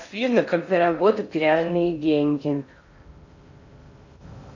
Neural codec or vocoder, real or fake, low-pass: codec, 16 kHz in and 24 kHz out, 0.6 kbps, FocalCodec, streaming, 4096 codes; fake; 7.2 kHz